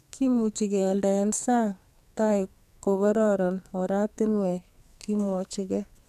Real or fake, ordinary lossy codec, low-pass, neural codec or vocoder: fake; none; 14.4 kHz; codec, 44.1 kHz, 2.6 kbps, SNAC